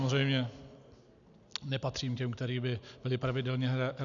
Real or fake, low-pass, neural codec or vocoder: real; 7.2 kHz; none